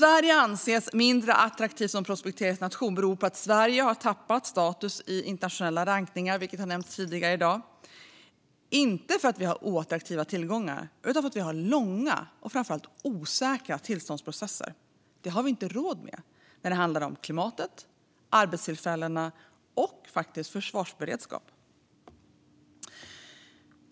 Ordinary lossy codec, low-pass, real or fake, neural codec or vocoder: none; none; real; none